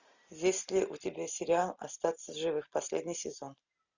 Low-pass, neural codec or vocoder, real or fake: 7.2 kHz; none; real